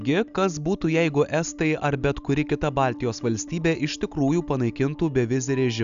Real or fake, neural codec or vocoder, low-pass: real; none; 7.2 kHz